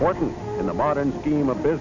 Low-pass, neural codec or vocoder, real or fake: 7.2 kHz; none; real